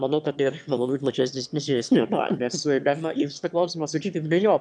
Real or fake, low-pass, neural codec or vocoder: fake; 9.9 kHz; autoencoder, 22.05 kHz, a latent of 192 numbers a frame, VITS, trained on one speaker